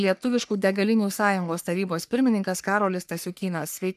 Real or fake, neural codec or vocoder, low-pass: fake; codec, 44.1 kHz, 3.4 kbps, Pupu-Codec; 14.4 kHz